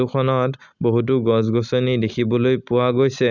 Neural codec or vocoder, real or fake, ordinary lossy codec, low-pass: none; real; none; 7.2 kHz